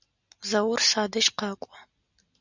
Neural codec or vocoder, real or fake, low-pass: none; real; 7.2 kHz